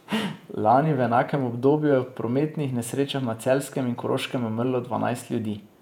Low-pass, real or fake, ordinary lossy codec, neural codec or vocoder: 19.8 kHz; fake; none; vocoder, 48 kHz, 128 mel bands, Vocos